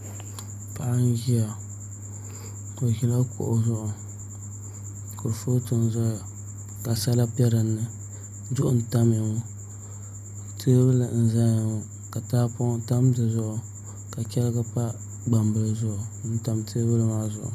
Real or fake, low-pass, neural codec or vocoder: real; 14.4 kHz; none